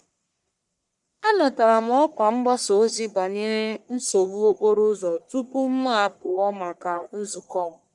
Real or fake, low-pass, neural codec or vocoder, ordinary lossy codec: fake; 10.8 kHz; codec, 44.1 kHz, 1.7 kbps, Pupu-Codec; none